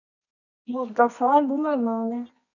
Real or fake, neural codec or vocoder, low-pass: fake; codec, 24 kHz, 0.9 kbps, WavTokenizer, medium music audio release; 7.2 kHz